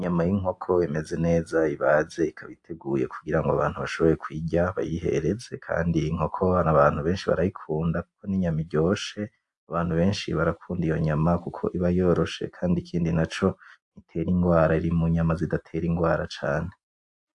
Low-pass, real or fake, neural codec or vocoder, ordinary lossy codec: 10.8 kHz; real; none; AAC, 64 kbps